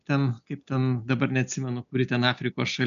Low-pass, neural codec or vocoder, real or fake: 7.2 kHz; none; real